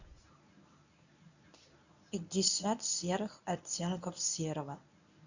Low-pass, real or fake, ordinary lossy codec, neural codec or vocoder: 7.2 kHz; fake; none; codec, 24 kHz, 0.9 kbps, WavTokenizer, medium speech release version 1